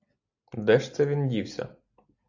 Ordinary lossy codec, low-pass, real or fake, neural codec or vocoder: AAC, 48 kbps; 7.2 kHz; real; none